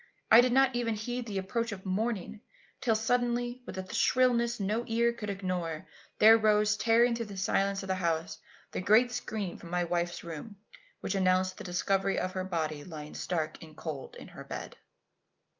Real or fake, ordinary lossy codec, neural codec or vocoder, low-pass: real; Opus, 32 kbps; none; 7.2 kHz